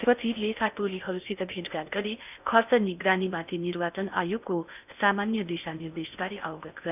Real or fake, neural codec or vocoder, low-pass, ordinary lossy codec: fake; codec, 16 kHz in and 24 kHz out, 0.8 kbps, FocalCodec, streaming, 65536 codes; 3.6 kHz; none